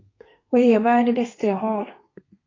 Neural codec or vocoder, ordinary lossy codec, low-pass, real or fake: codec, 32 kHz, 1.9 kbps, SNAC; AAC, 32 kbps; 7.2 kHz; fake